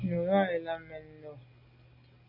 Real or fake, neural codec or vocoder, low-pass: real; none; 5.4 kHz